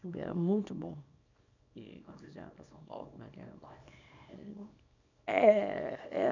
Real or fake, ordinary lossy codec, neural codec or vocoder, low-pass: fake; none; codec, 24 kHz, 0.9 kbps, WavTokenizer, small release; 7.2 kHz